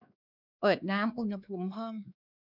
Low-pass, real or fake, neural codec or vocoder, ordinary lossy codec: 5.4 kHz; fake; codec, 16 kHz, 2 kbps, X-Codec, WavLM features, trained on Multilingual LibriSpeech; none